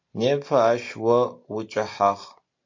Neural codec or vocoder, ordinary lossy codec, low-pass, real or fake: none; MP3, 48 kbps; 7.2 kHz; real